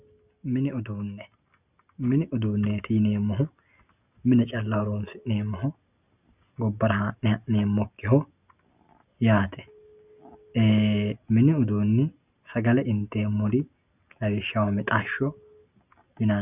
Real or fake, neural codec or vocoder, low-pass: real; none; 3.6 kHz